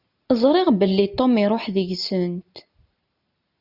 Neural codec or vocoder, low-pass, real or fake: none; 5.4 kHz; real